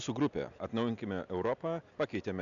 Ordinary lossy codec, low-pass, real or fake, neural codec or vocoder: MP3, 96 kbps; 7.2 kHz; real; none